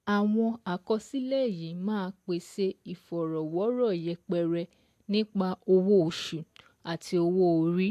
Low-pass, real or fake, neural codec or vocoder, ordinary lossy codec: 14.4 kHz; real; none; AAC, 64 kbps